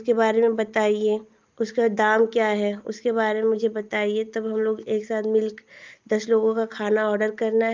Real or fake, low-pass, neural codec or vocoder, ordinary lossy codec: real; 7.2 kHz; none; Opus, 24 kbps